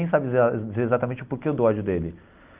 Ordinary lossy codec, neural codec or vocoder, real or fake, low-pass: Opus, 32 kbps; none; real; 3.6 kHz